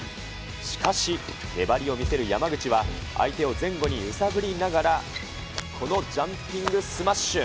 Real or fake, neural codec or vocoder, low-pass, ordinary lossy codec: real; none; none; none